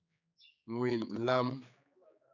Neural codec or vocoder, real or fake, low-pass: codec, 16 kHz, 4 kbps, X-Codec, HuBERT features, trained on general audio; fake; 7.2 kHz